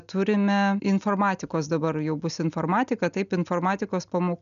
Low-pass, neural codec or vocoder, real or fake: 7.2 kHz; none; real